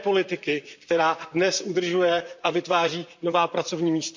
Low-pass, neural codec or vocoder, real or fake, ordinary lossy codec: 7.2 kHz; vocoder, 44.1 kHz, 128 mel bands, Pupu-Vocoder; fake; MP3, 64 kbps